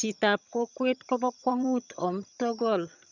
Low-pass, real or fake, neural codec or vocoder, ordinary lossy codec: 7.2 kHz; fake; vocoder, 22.05 kHz, 80 mel bands, HiFi-GAN; none